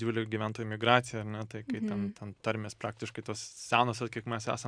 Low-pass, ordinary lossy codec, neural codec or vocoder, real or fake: 9.9 kHz; MP3, 96 kbps; none; real